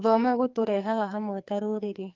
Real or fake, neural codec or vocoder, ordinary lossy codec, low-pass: fake; codec, 44.1 kHz, 2.6 kbps, SNAC; Opus, 24 kbps; 7.2 kHz